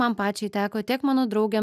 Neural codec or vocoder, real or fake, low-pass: none; real; 14.4 kHz